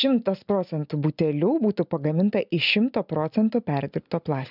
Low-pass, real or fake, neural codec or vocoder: 5.4 kHz; real; none